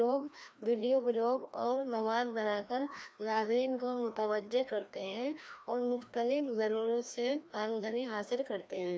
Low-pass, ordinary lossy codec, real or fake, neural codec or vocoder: none; none; fake; codec, 16 kHz, 1 kbps, FreqCodec, larger model